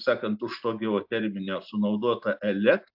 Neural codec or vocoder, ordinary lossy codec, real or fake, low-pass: none; AAC, 48 kbps; real; 5.4 kHz